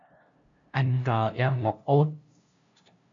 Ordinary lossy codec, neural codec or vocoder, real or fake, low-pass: MP3, 96 kbps; codec, 16 kHz, 0.5 kbps, FunCodec, trained on LibriTTS, 25 frames a second; fake; 7.2 kHz